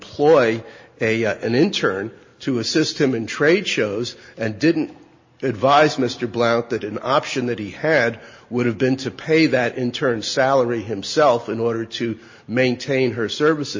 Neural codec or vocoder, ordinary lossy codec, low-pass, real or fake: none; MP3, 32 kbps; 7.2 kHz; real